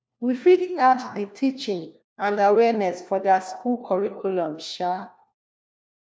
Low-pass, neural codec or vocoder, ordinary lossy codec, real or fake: none; codec, 16 kHz, 1 kbps, FunCodec, trained on LibriTTS, 50 frames a second; none; fake